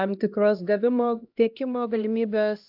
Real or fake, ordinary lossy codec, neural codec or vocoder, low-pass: fake; AAC, 48 kbps; codec, 16 kHz, 1 kbps, X-Codec, HuBERT features, trained on LibriSpeech; 5.4 kHz